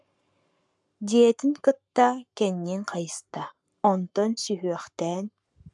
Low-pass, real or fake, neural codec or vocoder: 10.8 kHz; fake; codec, 44.1 kHz, 7.8 kbps, Pupu-Codec